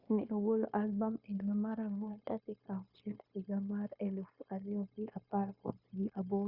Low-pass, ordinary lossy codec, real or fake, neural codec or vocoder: 5.4 kHz; Opus, 64 kbps; fake; codec, 16 kHz, 0.9 kbps, LongCat-Audio-Codec